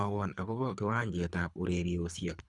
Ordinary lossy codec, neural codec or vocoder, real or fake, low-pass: none; codec, 24 kHz, 3 kbps, HILCodec; fake; 10.8 kHz